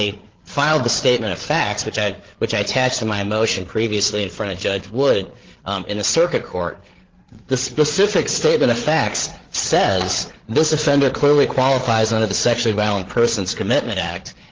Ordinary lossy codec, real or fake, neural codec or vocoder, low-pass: Opus, 16 kbps; fake; codec, 16 kHz, 8 kbps, FreqCodec, larger model; 7.2 kHz